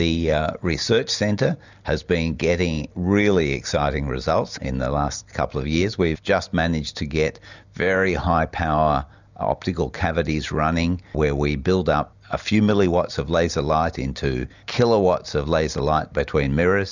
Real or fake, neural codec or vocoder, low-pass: real; none; 7.2 kHz